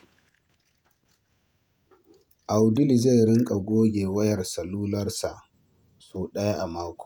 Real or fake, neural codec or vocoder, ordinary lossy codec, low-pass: fake; vocoder, 48 kHz, 128 mel bands, Vocos; none; none